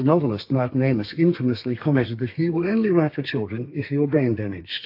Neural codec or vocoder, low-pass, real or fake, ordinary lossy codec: codec, 32 kHz, 1.9 kbps, SNAC; 5.4 kHz; fake; AAC, 32 kbps